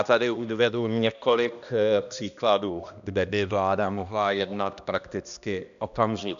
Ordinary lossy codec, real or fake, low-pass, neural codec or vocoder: MP3, 96 kbps; fake; 7.2 kHz; codec, 16 kHz, 1 kbps, X-Codec, HuBERT features, trained on balanced general audio